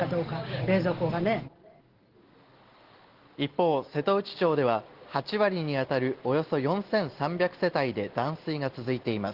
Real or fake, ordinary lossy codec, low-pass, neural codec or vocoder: real; Opus, 16 kbps; 5.4 kHz; none